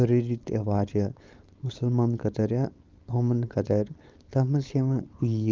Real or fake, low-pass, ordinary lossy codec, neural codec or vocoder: fake; 7.2 kHz; Opus, 24 kbps; codec, 16 kHz, 4.8 kbps, FACodec